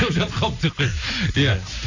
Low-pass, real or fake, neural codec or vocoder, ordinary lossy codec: 7.2 kHz; real; none; none